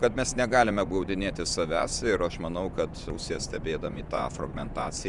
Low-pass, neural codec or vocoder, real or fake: 10.8 kHz; vocoder, 24 kHz, 100 mel bands, Vocos; fake